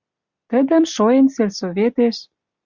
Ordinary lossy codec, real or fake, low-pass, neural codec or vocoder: Opus, 64 kbps; real; 7.2 kHz; none